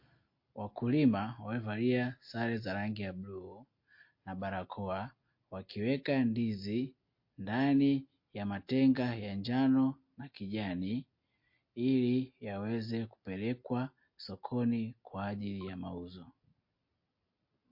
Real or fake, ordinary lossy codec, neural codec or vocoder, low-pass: real; MP3, 32 kbps; none; 5.4 kHz